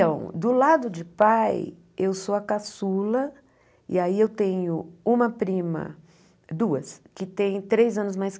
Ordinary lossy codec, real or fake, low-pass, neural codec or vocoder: none; real; none; none